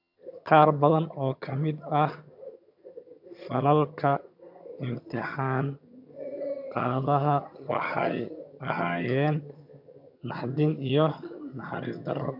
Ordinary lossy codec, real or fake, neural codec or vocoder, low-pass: none; fake; vocoder, 22.05 kHz, 80 mel bands, HiFi-GAN; 5.4 kHz